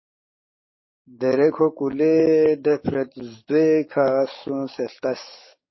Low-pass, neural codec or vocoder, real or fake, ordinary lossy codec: 7.2 kHz; codec, 44.1 kHz, 7.8 kbps, DAC; fake; MP3, 24 kbps